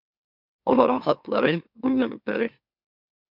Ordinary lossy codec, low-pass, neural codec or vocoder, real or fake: MP3, 48 kbps; 5.4 kHz; autoencoder, 44.1 kHz, a latent of 192 numbers a frame, MeloTTS; fake